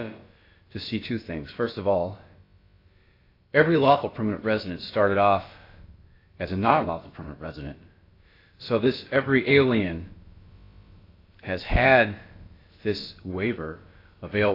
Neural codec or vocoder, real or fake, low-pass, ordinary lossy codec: codec, 16 kHz, about 1 kbps, DyCAST, with the encoder's durations; fake; 5.4 kHz; AAC, 32 kbps